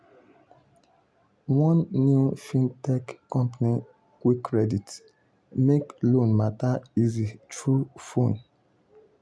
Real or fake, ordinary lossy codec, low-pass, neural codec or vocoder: real; none; none; none